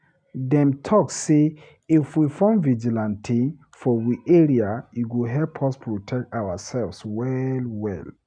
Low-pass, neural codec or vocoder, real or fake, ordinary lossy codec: 9.9 kHz; none; real; none